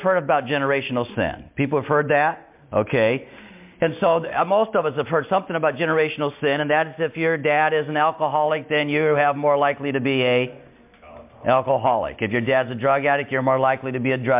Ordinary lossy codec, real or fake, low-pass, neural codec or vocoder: MP3, 32 kbps; real; 3.6 kHz; none